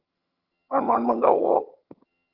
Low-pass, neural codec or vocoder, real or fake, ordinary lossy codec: 5.4 kHz; vocoder, 22.05 kHz, 80 mel bands, HiFi-GAN; fake; Opus, 32 kbps